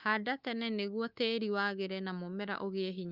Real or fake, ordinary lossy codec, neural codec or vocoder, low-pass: fake; Opus, 24 kbps; codec, 24 kHz, 3.1 kbps, DualCodec; 5.4 kHz